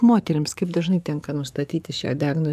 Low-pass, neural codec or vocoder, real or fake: 14.4 kHz; codec, 44.1 kHz, 7.8 kbps, DAC; fake